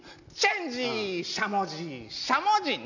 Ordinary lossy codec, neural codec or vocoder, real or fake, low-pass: none; none; real; 7.2 kHz